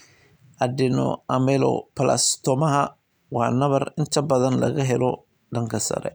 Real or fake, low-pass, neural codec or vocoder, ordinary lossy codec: real; none; none; none